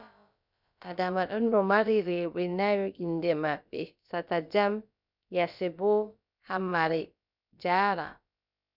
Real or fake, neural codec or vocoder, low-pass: fake; codec, 16 kHz, about 1 kbps, DyCAST, with the encoder's durations; 5.4 kHz